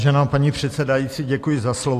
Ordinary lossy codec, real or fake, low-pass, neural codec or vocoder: AAC, 64 kbps; real; 14.4 kHz; none